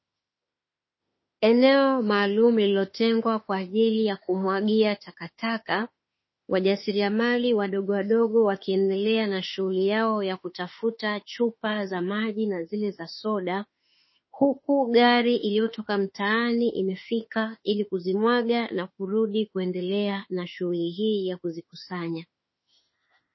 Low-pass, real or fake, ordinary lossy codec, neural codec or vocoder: 7.2 kHz; fake; MP3, 24 kbps; autoencoder, 48 kHz, 32 numbers a frame, DAC-VAE, trained on Japanese speech